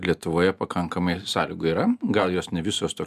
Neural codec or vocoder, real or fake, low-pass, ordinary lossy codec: none; real; 14.4 kHz; MP3, 96 kbps